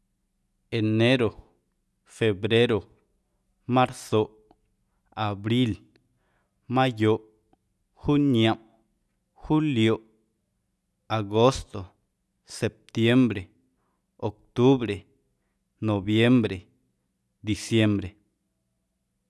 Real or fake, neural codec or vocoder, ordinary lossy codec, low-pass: real; none; none; none